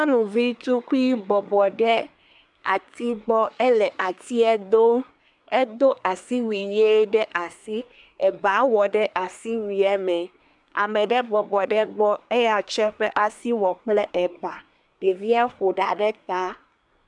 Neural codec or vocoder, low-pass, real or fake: codec, 24 kHz, 1 kbps, SNAC; 10.8 kHz; fake